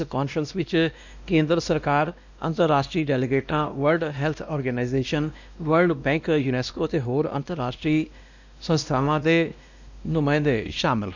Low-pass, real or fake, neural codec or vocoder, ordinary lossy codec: 7.2 kHz; fake; codec, 16 kHz, 1 kbps, X-Codec, WavLM features, trained on Multilingual LibriSpeech; none